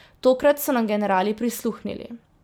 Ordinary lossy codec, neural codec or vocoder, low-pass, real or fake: none; vocoder, 44.1 kHz, 128 mel bands every 256 samples, BigVGAN v2; none; fake